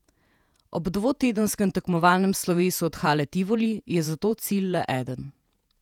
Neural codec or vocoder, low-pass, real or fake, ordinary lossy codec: vocoder, 48 kHz, 128 mel bands, Vocos; 19.8 kHz; fake; none